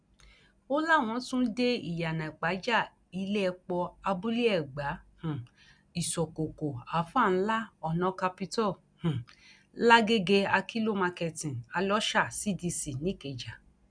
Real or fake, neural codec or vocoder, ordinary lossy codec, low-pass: real; none; none; 9.9 kHz